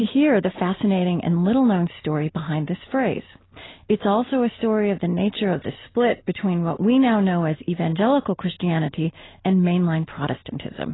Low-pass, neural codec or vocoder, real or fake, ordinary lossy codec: 7.2 kHz; none; real; AAC, 16 kbps